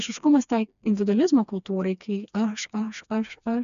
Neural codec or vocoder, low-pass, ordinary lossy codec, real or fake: codec, 16 kHz, 2 kbps, FreqCodec, smaller model; 7.2 kHz; MP3, 96 kbps; fake